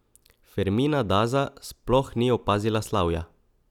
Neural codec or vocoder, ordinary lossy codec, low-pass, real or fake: none; none; 19.8 kHz; real